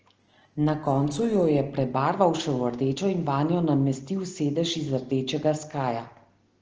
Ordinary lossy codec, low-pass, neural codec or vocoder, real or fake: Opus, 16 kbps; 7.2 kHz; none; real